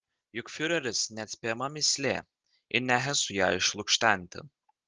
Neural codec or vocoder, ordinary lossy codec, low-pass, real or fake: none; Opus, 16 kbps; 7.2 kHz; real